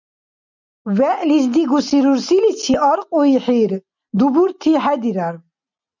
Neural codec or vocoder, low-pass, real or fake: none; 7.2 kHz; real